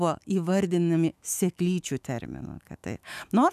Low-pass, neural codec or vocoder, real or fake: 14.4 kHz; autoencoder, 48 kHz, 128 numbers a frame, DAC-VAE, trained on Japanese speech; fake